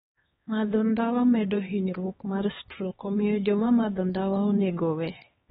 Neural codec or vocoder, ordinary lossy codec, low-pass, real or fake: codec, 16 kHz, 2 kbps, X-Codec, HuBERT features, trained on LibriSpeech; AAC, 16 kbps; 7.2 kHz; fake